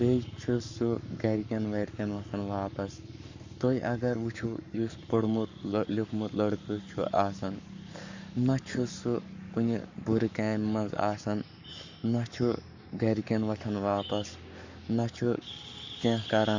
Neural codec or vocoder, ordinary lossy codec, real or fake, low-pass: codec, 44.1 kHz, 7.8 kbps, Pupu-Codec; Opus, 64 kbps; fake; 7.2 kHz